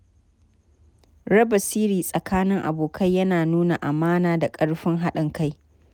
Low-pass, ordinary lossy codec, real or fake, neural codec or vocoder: 19.8 kHz; none; real; none